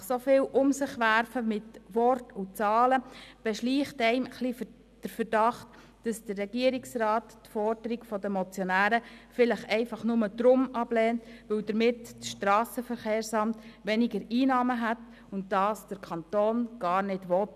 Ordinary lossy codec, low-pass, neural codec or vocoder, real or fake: none; 14.4 kHz; none; real